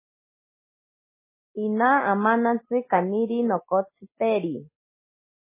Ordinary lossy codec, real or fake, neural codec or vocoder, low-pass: MP3, 16 kbps; real; none; 3.6 kHz